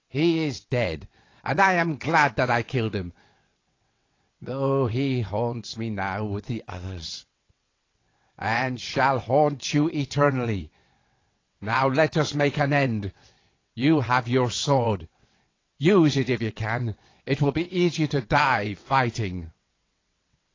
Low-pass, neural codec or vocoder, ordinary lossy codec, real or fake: 7.2 kHz; vocoder, 22.05 kHz, 80 mel bands, WaveNeXt; AAC, 32 kbps; fake